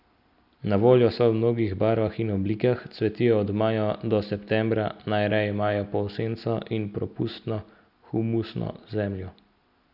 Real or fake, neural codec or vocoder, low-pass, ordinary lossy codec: real; none; 5.4 kHz; none